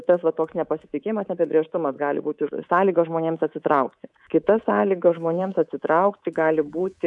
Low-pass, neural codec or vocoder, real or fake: 10.8 kHz; codec, 24 kHz, 3.1 kbps, DualCodec; fake